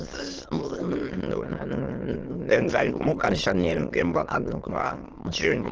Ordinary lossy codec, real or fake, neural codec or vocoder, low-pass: Opus, 16 kbps; fake; autoencoder, 22.05 kHz, a latent of 192 numbers a frame, VITS, trained on many speakers; 7.2 kHz